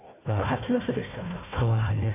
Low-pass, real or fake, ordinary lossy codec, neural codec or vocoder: 3.6 kHz; fake; none; codec, 16 kHz, 1 kbps, FunCodec, trained on Chinese and English, 50 frames a second